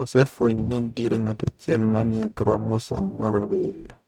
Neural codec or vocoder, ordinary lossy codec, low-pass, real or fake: codec, 44.1 kHz, 0.9 kbps, DAC; MP3, 96 kbps; 19.8 kHz; fake